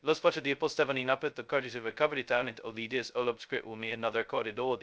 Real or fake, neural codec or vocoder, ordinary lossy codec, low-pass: fake; codec, 16 kHz, 0.2 kbps, FocalCodec; none; none